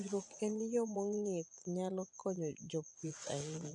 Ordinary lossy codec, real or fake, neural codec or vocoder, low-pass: none; fake; vocoder, 24 kHz, 100 mel bands, Vocos; 10.8 kHz